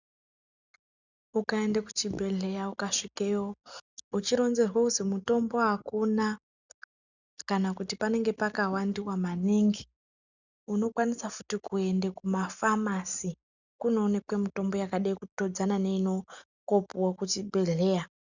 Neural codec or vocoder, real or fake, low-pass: none; real; 7.2 kHz